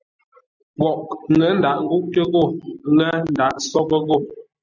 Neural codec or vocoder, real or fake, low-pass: none; real; 7.2 kHz